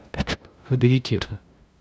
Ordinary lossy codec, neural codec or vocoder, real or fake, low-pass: none; codec, 16 kHz, 0.5 kbps, FunCodec, trained on LibriTTS, 25 frames a second; fake; none